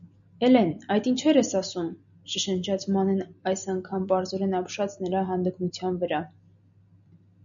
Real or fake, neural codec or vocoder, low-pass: real; none; 7.2 kHz